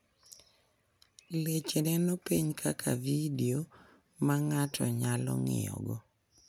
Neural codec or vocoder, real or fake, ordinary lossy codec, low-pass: none; real; none; none